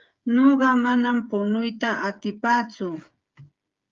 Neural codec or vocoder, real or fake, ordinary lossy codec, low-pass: codec, 16 kHz, 8 kbps, FreqCodec, smaller model; fake; Opus, 32 kbps; 7.2 kHz